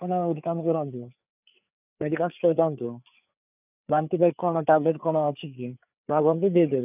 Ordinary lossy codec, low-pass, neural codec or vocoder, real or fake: none; 3.6 kHz; codec, 16 kHz, 4 kbps, FreqCodec, larger model; fake